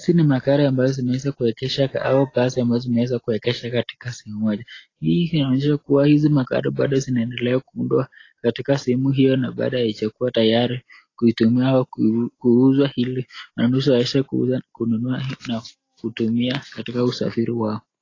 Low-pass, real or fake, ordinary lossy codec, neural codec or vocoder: 7.2 kHz; real; AAC, 32 kbps; none